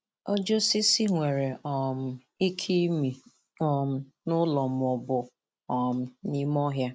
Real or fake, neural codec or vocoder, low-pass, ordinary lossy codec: real; none; none; none